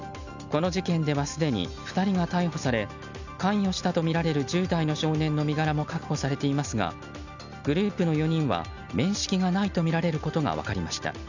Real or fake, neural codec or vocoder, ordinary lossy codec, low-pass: real; none; none; 7.2 kHz